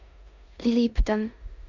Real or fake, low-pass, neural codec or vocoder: fake; 7.2 kHz; codec, 16 kHz in and 24 kHz out, 0.9 kbps, LongCat-Audio-Codec, four codebook decoder